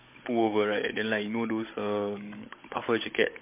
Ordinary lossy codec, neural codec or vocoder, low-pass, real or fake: MP3, 24 kbps; codec, 16 kHz, 16 kbps, FunCodec, trained on Chinese and English, 50 frames a second; 3.6 kHz; fake